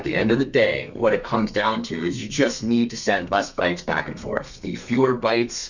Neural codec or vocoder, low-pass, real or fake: codec, 32 kHz, 1.9 kbps, SNAC; 7.2 kHz; fake